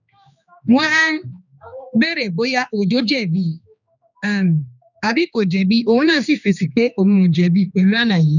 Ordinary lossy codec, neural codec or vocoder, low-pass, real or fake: none; codec, 16 kHz, 2 kbps, X-Codec, HuBERT features, trained on general audio; 7.2 kHz; fake